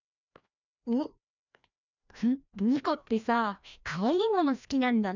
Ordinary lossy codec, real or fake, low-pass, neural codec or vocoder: none; fake; 7.2 kHz; codec, 16 kHz, 1 kbps, FreqCodec, larger model